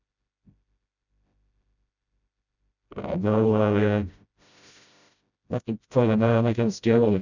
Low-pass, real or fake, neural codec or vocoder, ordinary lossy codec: 7.2 kHz; fake; codec, 16 kHz, 0.5 kbps, FreqCodec, smaller model; none